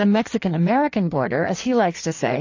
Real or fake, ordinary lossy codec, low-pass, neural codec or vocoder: fake; AAC, 48 kbps; 7.2 kHz; codec, 16 kHz in and 24 kHz out, 1.1 kbps, FireRedTTS-2 codec